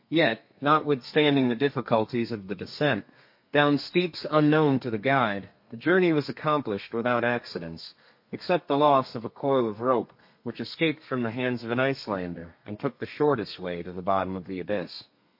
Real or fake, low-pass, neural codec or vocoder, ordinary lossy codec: fake; 5.4 kHz; codec, 32 kHz, 1.9 kbps, SNAC; MP3, 32 kbps